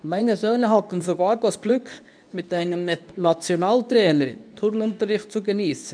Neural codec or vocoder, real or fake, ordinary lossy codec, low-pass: codec, 24 kHz, 0.9 kbps, WavTokenizer, medium speech release version 2; fake; none; 9.9 kHz